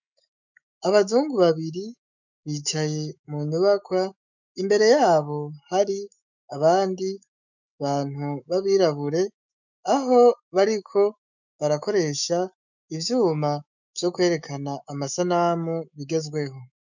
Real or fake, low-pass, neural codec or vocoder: fake; 7.2 kHz; autoencoder, 48 kHz, 128 numbers a frame, DAC-VAE, trained on Japanese speech